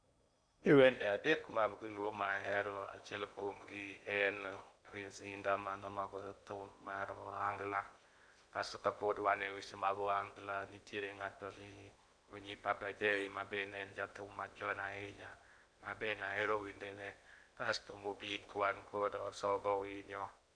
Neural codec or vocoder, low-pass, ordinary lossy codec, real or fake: codec, 16 kHz in and 24 kHz out, 0.8 kbps, FocalCodec, streaming, 65536 codes; 9.9 kHz; none; fake